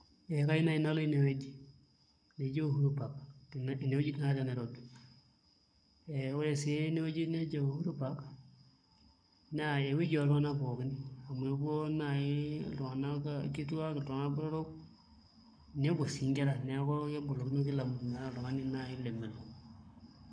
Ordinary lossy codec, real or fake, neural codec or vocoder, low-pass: none; fake; codec, 44.1 kHz, 7.8 kbps, Pupu-Codec; 9.9 kHz